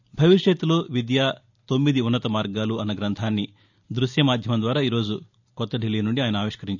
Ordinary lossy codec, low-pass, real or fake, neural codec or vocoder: none; 7.2 kHz; real; none